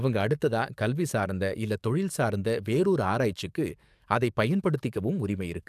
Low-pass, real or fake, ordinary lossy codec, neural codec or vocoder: 14.4 kHz; fake; AAC, 96 kbps; codec, 44.1 kHz, 7.8 kbps, DAC